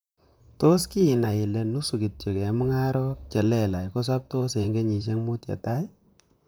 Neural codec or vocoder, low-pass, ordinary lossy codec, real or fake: vocoder, 44.1 kHz, 128 mel bands, Pupu-Vocoder; none; none; fake